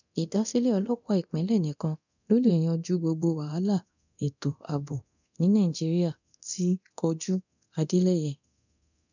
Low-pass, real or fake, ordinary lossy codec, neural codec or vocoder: 7.2 kHz; fake; none; codec, 24 kHz, 0.9 kbps, DualCodec